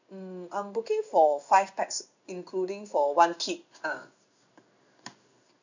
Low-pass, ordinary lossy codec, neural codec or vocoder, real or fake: 7.2 kHz; none; codec, 16 kHz in and 24 kHz out, 1 kbps, XY-Tokenizer; fake